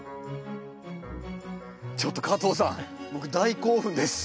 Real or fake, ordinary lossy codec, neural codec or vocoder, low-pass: real; none; none; none